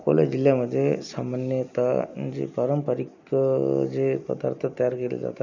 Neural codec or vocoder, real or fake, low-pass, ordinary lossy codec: none; real; 7.2 kHz; none